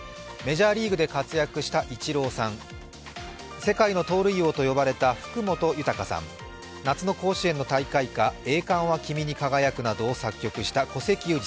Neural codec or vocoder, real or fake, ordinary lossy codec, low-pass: none; real; none; none